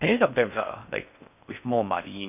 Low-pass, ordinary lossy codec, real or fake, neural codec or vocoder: 3.6 kHz; none; fake; codec, 16 kHz in and 24 kHz out, 0.6 kbps, FocalCodec, streaming, 4096 codes